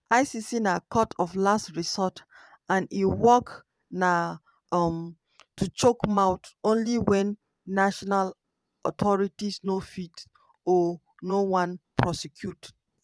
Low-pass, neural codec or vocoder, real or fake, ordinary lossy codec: none; vocoder, 22.05 kHz, 80 mel bands, Vocos; fake; none